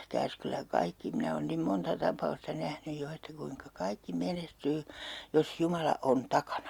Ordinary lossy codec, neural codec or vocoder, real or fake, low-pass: Opus, 64 kbps; none; real; 19.8 kHz